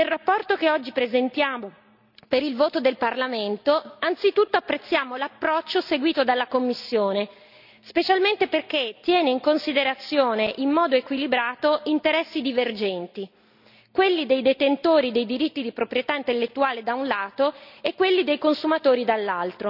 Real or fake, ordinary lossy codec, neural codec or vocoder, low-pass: real; none; none; 5.4 kHz